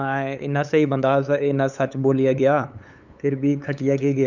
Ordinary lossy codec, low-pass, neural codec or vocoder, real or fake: none; 7.2 kHz; codec, 16 kHz, 8 kbps, FunCodec, trained on LibriTTS, 25 frames a second; fake